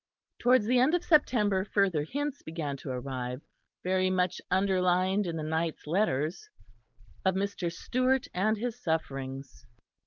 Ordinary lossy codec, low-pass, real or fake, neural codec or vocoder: Opus, 32 kbps; 7.2 kHz; real; none